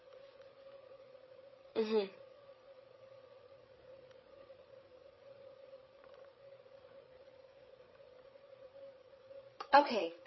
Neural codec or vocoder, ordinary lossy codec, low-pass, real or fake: codec, 16 kHz, 8 kbps, FreqCodec, larger model; MP3, 24 kbps; 7.2 kHz; fake